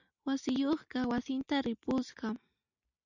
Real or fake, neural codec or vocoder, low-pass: real; none; 7.2 kHz